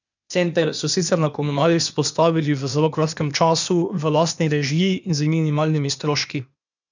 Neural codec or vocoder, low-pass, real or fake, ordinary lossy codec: codec, 16 kHz, 0.8 kbps, ZipCodec; 7.2 kHz; fake; none